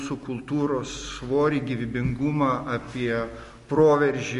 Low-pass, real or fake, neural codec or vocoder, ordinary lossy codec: 14.4 kHz; real; none; MP3, 48 kbps